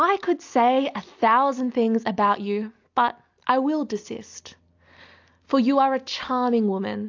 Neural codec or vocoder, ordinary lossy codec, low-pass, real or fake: none; AAC, 48 kbps; 7.2 kHz; real